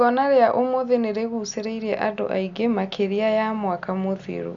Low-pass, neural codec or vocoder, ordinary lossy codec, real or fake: 7.2 kHz; none; Opus, 64 kbps; real